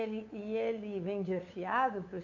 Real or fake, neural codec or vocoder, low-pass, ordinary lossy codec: fake; codec, 16 kHz, 8 kbps, FunCodec, trained on LibriTTS, 25 frames a second; 7.2 kHz; AAC, 48 kbps